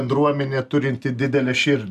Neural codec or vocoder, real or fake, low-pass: none; real; 14.4 kHz